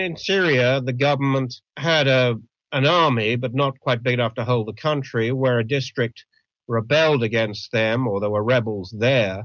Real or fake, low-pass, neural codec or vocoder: real; 7.2 kHz; none